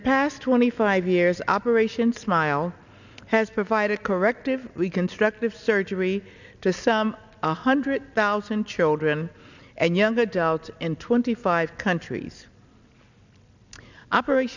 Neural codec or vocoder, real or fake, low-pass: none; real; 7.2 kHz